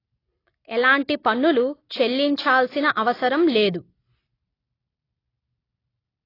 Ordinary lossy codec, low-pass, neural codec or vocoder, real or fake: AAC, 24 kbps; 5.4 kHz; none; real